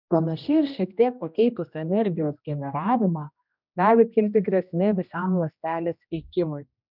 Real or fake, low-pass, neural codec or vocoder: fake; 5.4 kHz; codec, 16 kHz, 1 kbps, X-Codec, HuBERT features, trained on general audio